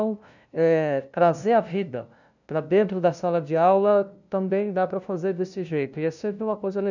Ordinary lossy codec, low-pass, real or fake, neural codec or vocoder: none; 7.2 kHz; fake; codec, 16 kHz, 0.5 kbps, FunCodec, trained on LibriTTS, 25 frames a second